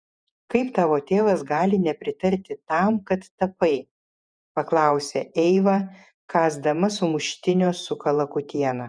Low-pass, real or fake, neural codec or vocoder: 9.9 kHz; fake; vocoder, 48 kHz, 128 mel bands, Vocos